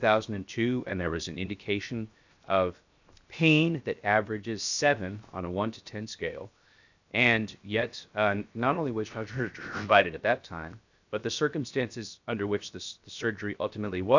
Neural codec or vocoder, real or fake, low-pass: codec, 16 kHz, 0.7 kbps, FocalCodec; fake; 7.2 kHz